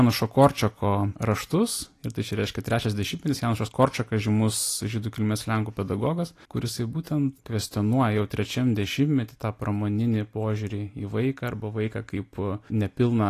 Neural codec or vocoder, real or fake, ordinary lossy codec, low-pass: none; real; AAC, 48 kbps; 14.4 kHz